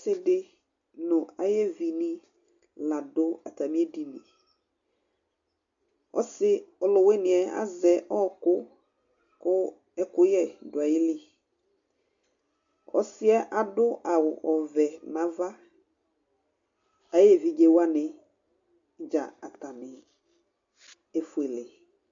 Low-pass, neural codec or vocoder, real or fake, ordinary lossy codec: 7.2 kHz; none; real; MP3, 64 kbps